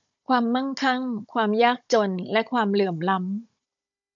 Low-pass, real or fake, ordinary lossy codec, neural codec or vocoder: 7.2 kHz; fake; AAC, 64 kbps; codec, 16 kHz, 16 kbps, FunCodec, trained on Chinese and English, 50 frames a second